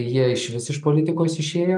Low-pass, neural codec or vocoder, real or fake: 10.8 kHz; none; real